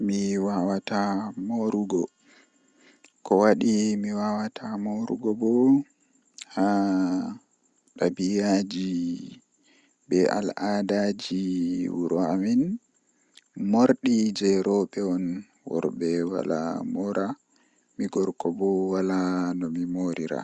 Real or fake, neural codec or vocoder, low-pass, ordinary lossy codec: real; none; 10.8 kHz; Opus, 64 kbps